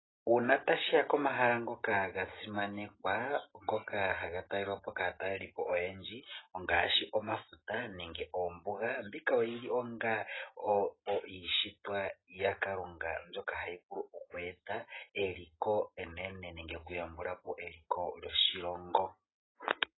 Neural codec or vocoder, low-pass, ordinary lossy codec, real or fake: none; 7.2 kHz; AAC, 16 kbps; real